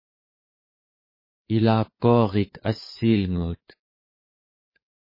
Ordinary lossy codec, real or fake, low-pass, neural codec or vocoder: MP3, 24 kbps; fake; 5.4 kHz; codec, 16 kHz, 4 kbps, FreqCodec, larger model